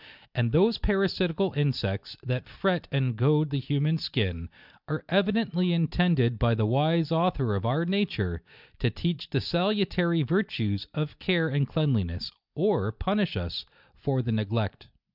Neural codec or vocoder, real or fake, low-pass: none; real; 5.4 kHz